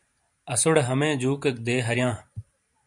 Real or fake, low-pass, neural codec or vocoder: fake; 10.8 kHz; vocoder, 44.1 kHz, 128 mel bands every 512 samples, BigVGAN v2